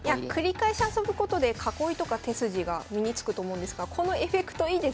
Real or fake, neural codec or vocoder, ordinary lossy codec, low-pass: real; none; none; none